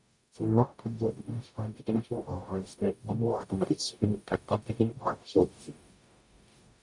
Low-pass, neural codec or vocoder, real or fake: 10.8 kHz; codec, 44.1 kHz, 0.9 kbps, DAC; fake